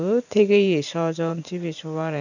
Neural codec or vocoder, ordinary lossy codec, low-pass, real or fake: vocoder, 44.1 kHz, 128 mel bands every 512 samples, BigVGAN v2; none; 7.2 kHz; fake